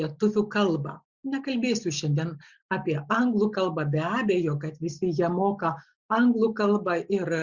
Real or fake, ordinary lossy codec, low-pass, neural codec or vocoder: real; Opus, 64 kbps; 7.2 kHz; none